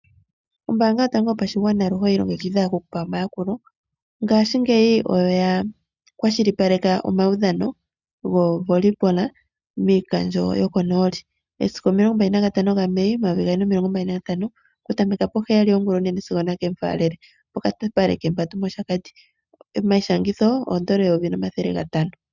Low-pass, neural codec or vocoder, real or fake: 7.2 kHz; none; real